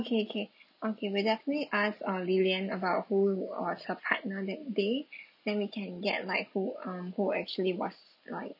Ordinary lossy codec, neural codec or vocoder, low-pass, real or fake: none; none; 5.4 kHz; real